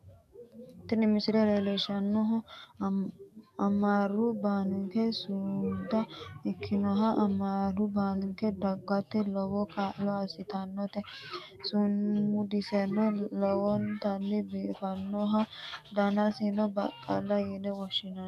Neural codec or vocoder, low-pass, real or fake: codec, 44.1 kHz, 7.8 kbps, DAC; 14.4 kHz; fake